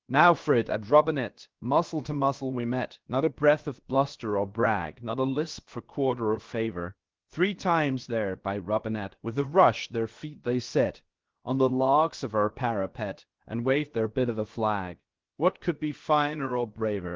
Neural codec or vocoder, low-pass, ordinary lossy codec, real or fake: codec, 16 kHz, about 1 kbps, DyCAST, with the encoder's durations; 7.2 kHz; Opus, 16 kbps; fake